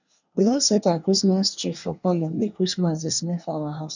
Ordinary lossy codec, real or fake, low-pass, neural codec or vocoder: none; fake; 7.2 kHz; codec, 24 kHz, 1 kbps, SNAC